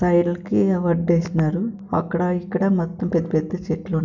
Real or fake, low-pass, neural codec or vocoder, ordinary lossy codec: real; 7.2 kHz; none; none